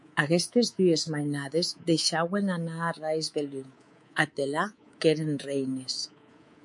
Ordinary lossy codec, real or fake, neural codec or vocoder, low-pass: MP3, 64 kbps; fake; codec, 24 kHz, 3.1 kbps, DualCodec; 10.8 kHz